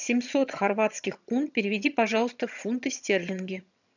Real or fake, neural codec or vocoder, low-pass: fake; vocoder, 22.05 kHz, 80 mel bands, HiFi-GAN; 7.2 kHz